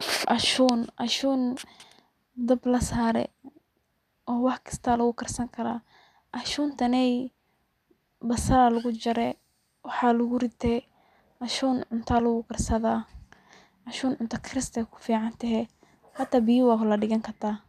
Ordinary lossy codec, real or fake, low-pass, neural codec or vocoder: none; real; 14.4 kHz; none